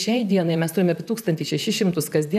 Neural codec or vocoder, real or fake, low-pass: vocoder, 44.1 kHz, 128 mel bands, Pupu-Vocoder; fake; 14.4 kHz